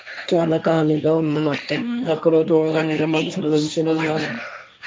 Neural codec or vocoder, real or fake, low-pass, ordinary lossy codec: codec, 16 kHz, 1.1 kbps, Voila-Tokenizer; fake; none; none